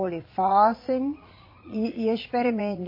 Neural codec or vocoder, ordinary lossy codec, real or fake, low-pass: vocoder, 22.05 kHz, 80 mel bands, Vocos; MP3, 24 kbps; fake; 5.4 kHz